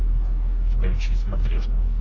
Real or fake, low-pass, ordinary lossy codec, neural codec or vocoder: fake; 7.2 kHz; AAC, 32 kbps; codec, 44.1 kHz, 2.6 kbps, DAC